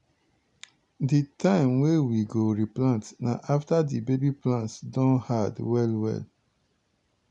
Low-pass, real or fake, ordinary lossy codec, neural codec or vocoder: 10.8 kHz; real; none; none